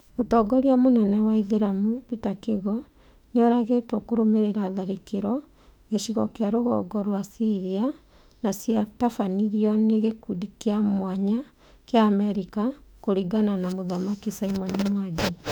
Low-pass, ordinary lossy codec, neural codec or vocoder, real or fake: 19.8 kHz; none; autoencoder, 48 kHz, 32 numbers a frame, DAC-VAE, trained on Japanese speech; fake